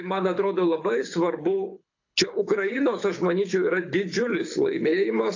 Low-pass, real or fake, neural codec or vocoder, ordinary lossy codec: 7.2 kHz; fake; codec, 24 kHz, 6 kbps, HILCodec; AAC, 48 kbps